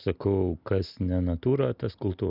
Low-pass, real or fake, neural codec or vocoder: 5.4 kHz; real; none